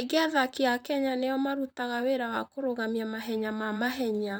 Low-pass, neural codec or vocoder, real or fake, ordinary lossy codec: none; vocoder, 44.1 kHz, 128 mel bands, Pupu-Vocoder; fake; none